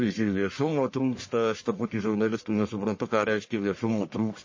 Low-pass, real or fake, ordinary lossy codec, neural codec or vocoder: 7.2 kHz; fake; MP3, 32 kbps; codec, 44.1 kHz, 1.7 kbps, Pupu-Codec